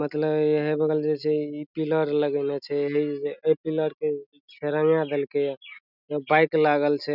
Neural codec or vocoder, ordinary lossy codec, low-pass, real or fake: none; none; 5.4 kHz; real